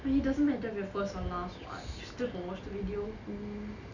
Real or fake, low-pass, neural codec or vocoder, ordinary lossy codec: real; 7.2 kHz; none; none